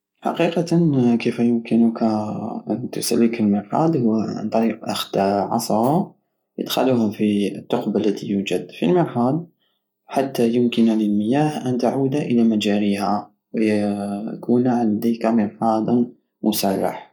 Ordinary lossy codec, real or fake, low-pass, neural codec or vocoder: none; real; 19.8 kHz; none